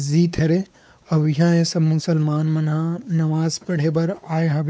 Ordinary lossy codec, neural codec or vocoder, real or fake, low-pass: none; codec, 16 kHz, 4 kbps, X-Codec, WavLM features, trained on Multilingual LibriSpeech; fake; none